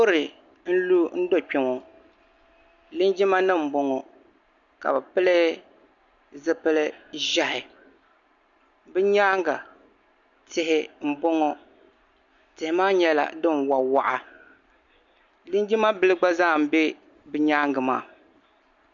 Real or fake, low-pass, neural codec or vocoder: real; 7.2 kHz; none